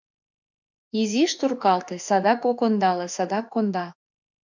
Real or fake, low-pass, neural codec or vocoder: fake; 7.2 kHz; autoencoder, 48 kHz, 32 numbers a frame, DAC-VAE, trained on Japanese speech